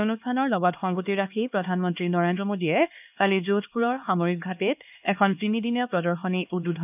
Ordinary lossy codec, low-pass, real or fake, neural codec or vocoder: none; 3.6 kHz; fake; codec, 16 kHz, 2 kbps, X-Codec, HuBERT features, trained on LibriSpeech